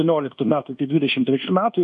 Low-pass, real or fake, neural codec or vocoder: 10.8 kHz; fake; codec, 24 kHz, 1.2 kbps, DualCodec